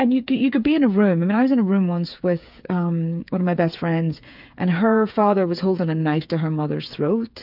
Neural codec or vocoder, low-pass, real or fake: codec, 16 kHz, 8 kbps, FreqCodec, smaller model; 5.4 kHz; fake